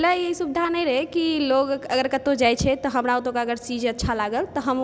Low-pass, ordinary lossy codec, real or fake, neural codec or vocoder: none; none; real; none